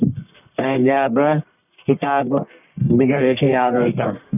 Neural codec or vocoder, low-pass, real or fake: codec, 44.1 kHz, 1.7 kbps, Pupu-Codec; 3.6 kHz; fake